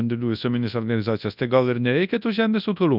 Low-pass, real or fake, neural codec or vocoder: 5.4 kHz; fake; codec, 24 kHz, 0.9 kbps, WavTokenizer, large speech release